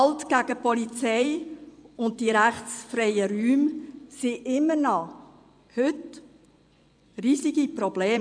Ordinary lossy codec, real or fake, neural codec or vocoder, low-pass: none; fake; vocoder, 24 kHz, 100 mel bands, Vocos; 9.9 kHz